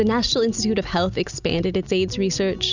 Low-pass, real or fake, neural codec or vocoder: 7.2 kHz; real; none